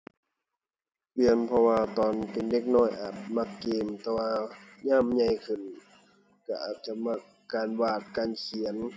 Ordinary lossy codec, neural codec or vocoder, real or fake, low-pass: none; none; real; none